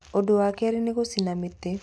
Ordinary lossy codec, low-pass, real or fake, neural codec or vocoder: none; none; real; none